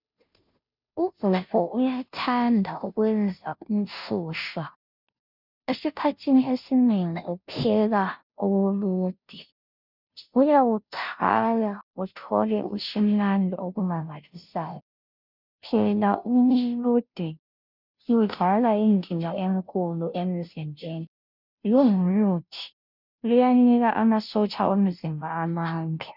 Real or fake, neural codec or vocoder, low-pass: fake; codec, 16 kHz, 0.5 kbps, FunCodec, trained on Chinese and English, 25 frames a second; 5.4 kHz